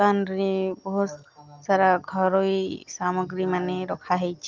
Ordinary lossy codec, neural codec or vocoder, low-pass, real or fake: Opus, 32 kbps; none; 7.2 kHz; real